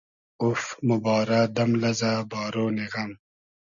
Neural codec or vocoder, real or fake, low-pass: none; real; 7.2 kHz